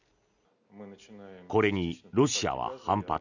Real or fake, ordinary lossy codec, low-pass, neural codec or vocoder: real; none; 7.2 kHz; none